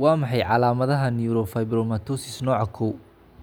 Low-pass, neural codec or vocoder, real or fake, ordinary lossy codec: none; none; real; none